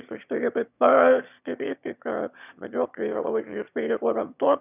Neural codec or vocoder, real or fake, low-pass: autoencoder, 22.05 kHz, a latent of 192 numbers a frame, VITS, trained on one speaker; fake; 3.6 kHz